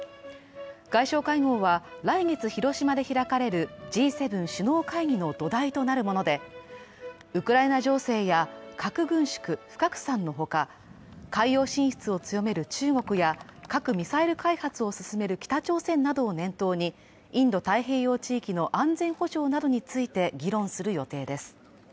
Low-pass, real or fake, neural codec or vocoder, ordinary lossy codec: none; real; none; none